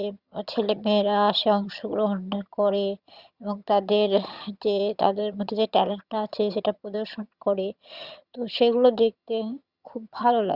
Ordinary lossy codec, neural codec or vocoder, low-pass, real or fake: Opus, 64 kbps; vocoder, 22.05 kHz, 80 mel bands, HiFi-GAN; 5.4 kHz; fake